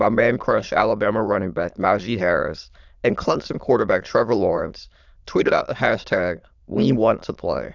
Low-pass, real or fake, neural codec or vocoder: 7.2 kHz; fake; autoencoder, 22.05 kHz, a latent of 192 numbers a frame, VITS, trained on many speakers